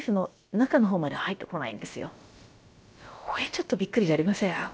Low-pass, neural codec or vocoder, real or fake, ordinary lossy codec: none; codec, 16 kHz, about 1 kbps, DyCAST, with the encoder's durations; fake; none